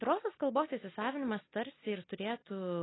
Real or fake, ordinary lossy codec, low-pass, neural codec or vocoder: real; AAC, 16 kbps; 7.2 kHz; none